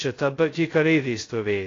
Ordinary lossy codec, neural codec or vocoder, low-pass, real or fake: AAC, 32 kbps; codec, 16 kHz, 0.2 kbps, FocalCodec; 7.2 kHz; fake